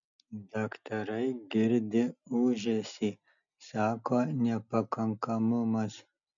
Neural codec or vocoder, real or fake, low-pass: none; real; 7.2 kHz